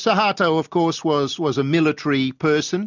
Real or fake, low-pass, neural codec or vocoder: real; 7.2 kHz; none